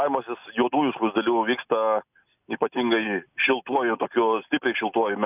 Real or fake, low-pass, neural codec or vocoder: real; 3.6 kHz; none